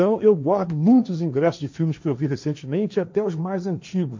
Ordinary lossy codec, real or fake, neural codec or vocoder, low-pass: MP3, 64 kbps; fake; codec, 16 kHz, 1.1 kbps, Voila-Tokenizer; 7.2 kHz